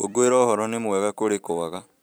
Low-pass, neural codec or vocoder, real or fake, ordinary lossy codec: none; vocoder, 44.1 kHz, 128 mel bands every 256 samples, BigVGAN v2; fake; none